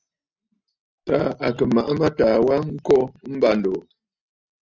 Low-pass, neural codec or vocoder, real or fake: 7.2 kHz; none; real